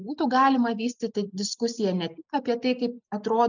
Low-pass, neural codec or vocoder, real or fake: 7.2 kHz; none; real